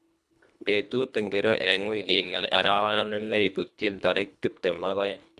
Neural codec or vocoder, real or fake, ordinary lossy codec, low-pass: codec, 24 kHz, 1.5 kbps, HILCodec; fake; none; none